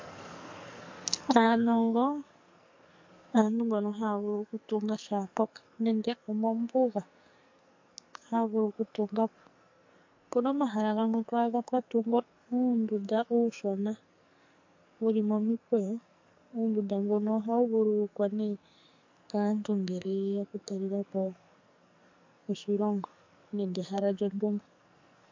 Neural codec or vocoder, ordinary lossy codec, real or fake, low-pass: codec, 44.1 kHz, 2.6 kbps, SNAC; MP3, 48 kbps; fake; 7.2 kHz